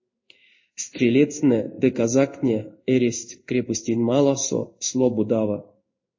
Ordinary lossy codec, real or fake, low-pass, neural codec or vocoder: MP3, 32 kbps; fake; 7.2 kHz; codec, 16 kHz in and 24 kHz out, 1 kbps, XY-Tokenizer